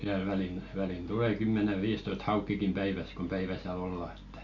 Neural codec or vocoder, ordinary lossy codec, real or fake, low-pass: none; none; real; 7.2 kHz